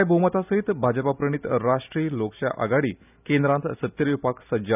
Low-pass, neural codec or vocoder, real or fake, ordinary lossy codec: 3.6 kHz; none; real; none